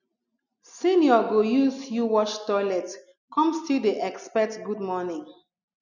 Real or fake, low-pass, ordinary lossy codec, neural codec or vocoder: real; 7.2 kHz; none; none